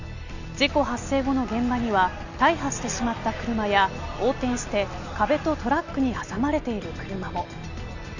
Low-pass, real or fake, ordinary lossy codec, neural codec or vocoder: 7.2 kHz; real; none; none